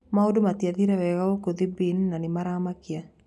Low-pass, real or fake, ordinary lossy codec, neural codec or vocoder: none; real; none; none